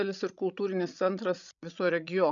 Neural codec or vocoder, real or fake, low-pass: codec, 16 kHz, 16 kbps, FreqCodec, larger model; fake; 7.2 kHz